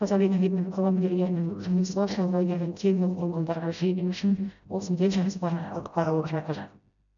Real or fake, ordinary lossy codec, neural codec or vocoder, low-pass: fake; none; codec, 16 kHz, 0.5 kbps, FreqCodec, smaller model; 7.2 kHz